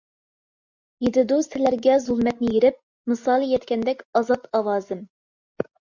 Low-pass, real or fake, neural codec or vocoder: 7.2 kHz; real; none